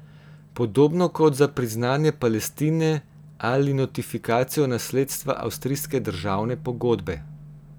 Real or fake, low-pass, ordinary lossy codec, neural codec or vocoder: real; none; none; none